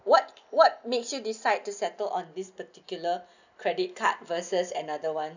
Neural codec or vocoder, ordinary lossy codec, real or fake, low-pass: none; none; real; 7.2 kHz